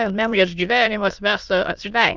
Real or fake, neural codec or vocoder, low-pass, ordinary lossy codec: fake; autoencoder, 22.05 kHz, a latent of 192 numbers a frame, VITS, trained on many speakers; 7.2 kHz; Opus, 64 kbps